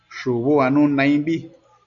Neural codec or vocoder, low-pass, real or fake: none; 7.2 kHz; real